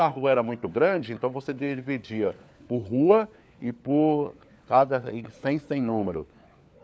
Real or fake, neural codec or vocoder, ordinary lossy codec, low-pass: fake; codec, 16 kHz, 4 kbps, FunCodec, trained on LibriTTS, 50 frames a second; none; none